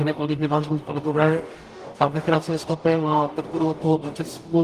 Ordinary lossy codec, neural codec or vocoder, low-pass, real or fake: Opus, 24 kbps; codec, 44.1 kHz, 0.9 kbps, DAC; 14.4 kHz; fake